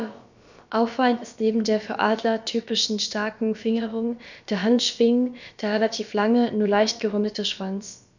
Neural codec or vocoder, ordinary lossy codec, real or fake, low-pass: codec, 16 kHz, about 1 kbps, DyCAST, with the encoder's durations; none; fake; 7.2 kHz